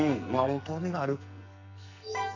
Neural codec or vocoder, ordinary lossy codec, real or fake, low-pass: codec, 44.1 kHz, 2.6 kbps, SNAC; none; fake; 7.2 kHz